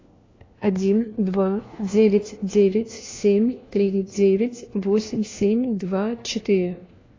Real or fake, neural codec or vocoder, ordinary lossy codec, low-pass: fake; codec, 16 kHz, 1 kbps, FunCodec, trained on LibriTTS, 50 frames a second; AAC, 32 kbps; 7.2 kHz